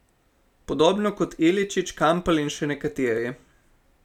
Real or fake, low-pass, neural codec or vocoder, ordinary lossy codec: real; 19.8 kHz; none; none